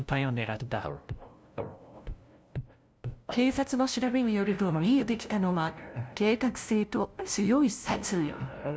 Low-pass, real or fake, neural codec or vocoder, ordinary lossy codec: none; fake; codec, 16 kHz, 0.5 kbps, FunCodec, trained on LibriTTS, 25 frames a second; none